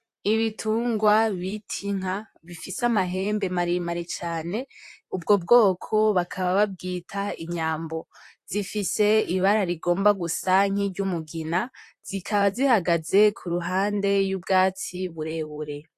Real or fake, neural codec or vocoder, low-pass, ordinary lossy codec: fake; vocoder, 44.1 kHz, 128 mel bands, Pupu-Vocoder; 14.4 kHz; AAC, 64 kbps